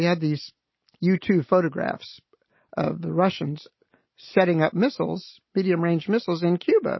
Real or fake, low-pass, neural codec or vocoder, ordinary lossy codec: real; 7.2 kHz; none; MP3, 24 kbps